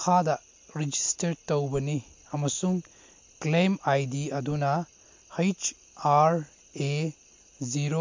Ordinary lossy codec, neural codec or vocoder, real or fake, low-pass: MP3, 48 kbps; none; real; 7.2 kHz